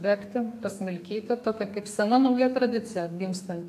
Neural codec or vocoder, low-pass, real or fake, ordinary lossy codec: codec, 32 kHz, 1.9 kbps, SNAC; 14.4 kHz; fake; AAC, 64 kbps